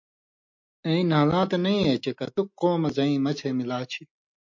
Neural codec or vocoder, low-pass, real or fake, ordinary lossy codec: none; 7.2 kHz; real; MP3, 48 kbps